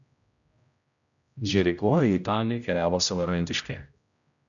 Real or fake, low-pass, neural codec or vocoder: fake; 7.2 kHz; codec, 16 kHz, 0.5 kbps, X-Codec, HuBERT features, trained on general audio